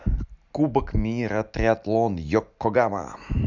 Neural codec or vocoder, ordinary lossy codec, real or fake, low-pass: none; none; real; 7.2 kHz